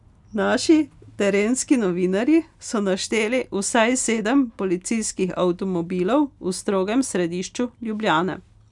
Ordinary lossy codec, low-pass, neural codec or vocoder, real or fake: none; 10.8 kHz; none; real